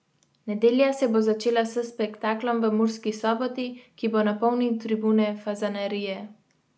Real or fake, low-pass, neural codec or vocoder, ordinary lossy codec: real; none; none; none